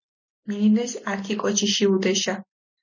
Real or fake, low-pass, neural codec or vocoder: real; 7.2 kHz; none